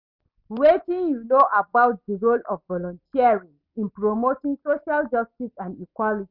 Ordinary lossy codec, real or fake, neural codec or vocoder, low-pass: none; real; none; 5.4 kHz